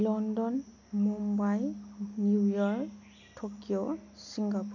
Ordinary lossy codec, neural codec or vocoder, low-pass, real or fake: none; none; 7.2 kHz; real